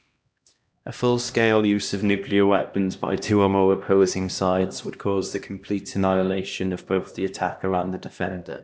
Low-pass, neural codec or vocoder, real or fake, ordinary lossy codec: none; codec, 16 kHz, 1 kbps, X-Codec, HuBERT features, trained on LibriSpeech; fake; none